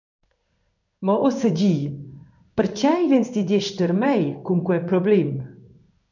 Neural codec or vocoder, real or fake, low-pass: codec, 16 kHz in and 24 kHz out, 1 kbps, XY-Tokenizer; fake; 7.2 kHz